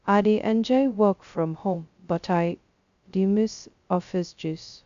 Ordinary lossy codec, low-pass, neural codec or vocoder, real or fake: Opus, 64 kbps; 7.2 kHz; codec, 16 kHz, 0.2 kbps, FocalCodec; fake